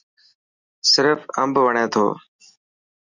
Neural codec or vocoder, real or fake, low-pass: none; real; 7.2 kHz